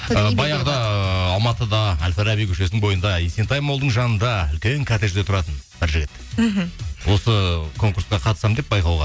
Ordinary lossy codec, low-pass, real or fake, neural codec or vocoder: none; none; real; none